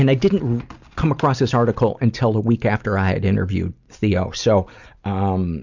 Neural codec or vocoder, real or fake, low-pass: none; real; 7.2 kHz